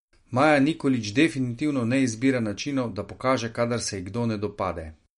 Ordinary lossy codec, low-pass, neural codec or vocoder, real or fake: MP3, 48 kbps; 19.8 kHz; none; real